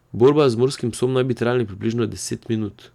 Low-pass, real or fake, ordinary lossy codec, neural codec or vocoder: 19.8 kHz; real; none; none